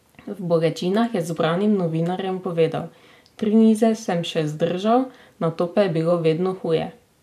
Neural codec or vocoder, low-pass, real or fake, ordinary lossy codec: vocoder, 44.1 kHz, 128 mel bands every 512 samples, BigVGAN v2; 14.4 kHz; fake; none